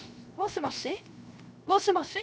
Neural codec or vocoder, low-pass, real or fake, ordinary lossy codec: codec, 16 kHz, 0.7 kbps, FocalCodec; none; fake; none